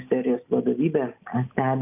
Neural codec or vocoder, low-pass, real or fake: none; 3.6 kHz; real